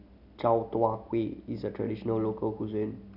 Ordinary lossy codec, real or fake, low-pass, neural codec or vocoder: none; real; 5.4 kHz; none